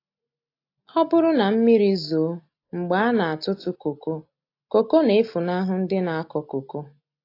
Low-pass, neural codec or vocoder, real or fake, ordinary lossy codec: 5.4 kHz; none; real; AAC, 32 kbps